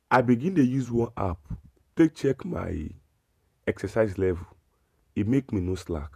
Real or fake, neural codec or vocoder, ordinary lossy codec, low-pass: fake; vocoder, 48 kHz, 128 mel bands, Vocos; none; 14.4 kHz